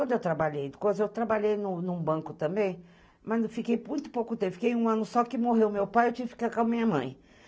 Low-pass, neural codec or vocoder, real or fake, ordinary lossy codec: none; none; real; none